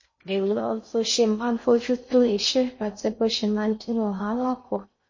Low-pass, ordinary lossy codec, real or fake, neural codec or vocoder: 7.2 kHz; MP3, 32 kbps; fake; codec, 16 kHz in and 24 kHz out, 0.6 kbps, FocalCodec, streaming, 4096 codes